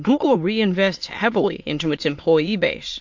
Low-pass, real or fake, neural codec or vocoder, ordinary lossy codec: 7.2 kHz; fake; autoencoder, 22.05 kHz, a latent of 192 numbers a frame, VITS, trained on many speakers; MP3, 48 kbps